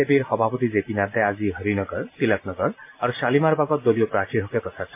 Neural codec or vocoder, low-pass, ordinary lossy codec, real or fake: none; 3.6 kHz; MP3, 24 kbps; real